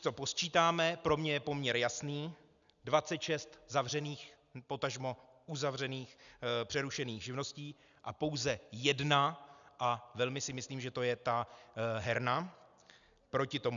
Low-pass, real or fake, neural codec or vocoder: 7.2 kHz; real; none